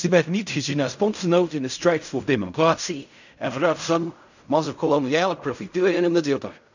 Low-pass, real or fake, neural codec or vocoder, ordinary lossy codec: 7.2 kHz; fake; codec, 16 kHz in and 24 kHz out, 0.4 kbps, LongCat-Audio-Codec, fine tuned four codebook decoder; none